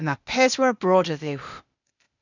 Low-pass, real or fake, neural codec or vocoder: 7.2 kHz; fake; codec, 16 kHz, 0.8 kbps, ZipCodec